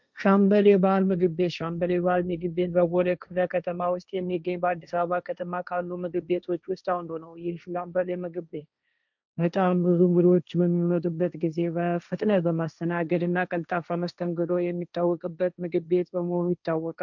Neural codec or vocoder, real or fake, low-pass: codec, 16 kHz, 1.1 kbps, Voila-Tokenizer; fake; 7.2 kHz